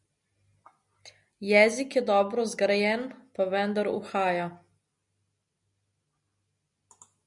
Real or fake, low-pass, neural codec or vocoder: real; 10.8 kHz; none